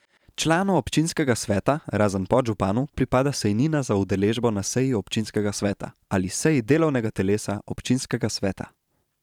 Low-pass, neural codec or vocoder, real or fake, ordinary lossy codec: 19.8 kHz; vocoder, 44.1 kHz, 128 mel bands every 512 samples, BigVGAN v2; fake; none